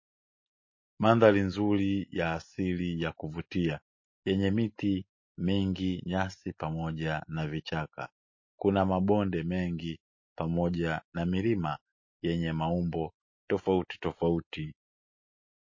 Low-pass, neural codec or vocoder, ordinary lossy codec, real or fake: 7.2 kHz; none; MP3, 32 kbps; real